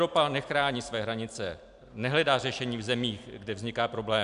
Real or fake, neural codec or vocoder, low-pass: real; none; 10.8 kHz